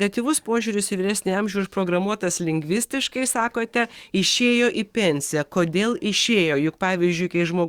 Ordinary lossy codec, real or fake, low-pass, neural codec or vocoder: Opus, 64 kbps; fake; 19.8 kHz; codec, 44.1 kHz, 7.8 kbps, DAC